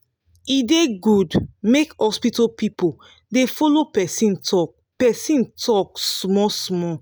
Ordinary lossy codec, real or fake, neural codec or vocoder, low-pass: none; real; none; none